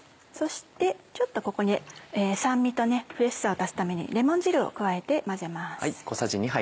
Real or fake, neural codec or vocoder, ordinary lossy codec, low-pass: real; none; none; none